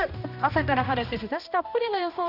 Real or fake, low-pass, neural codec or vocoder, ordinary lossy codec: fake; 5.4 kHz; codec, 16 kHz, 1 kbps, X-Codec, HuBERT features, trained on balanced general audio; none